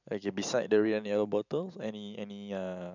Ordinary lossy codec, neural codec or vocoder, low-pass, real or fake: none; vocoder, 44.1 kHz, 128 mel bands every 512 samples, BigVGAN v2; 7.2 kHz; fake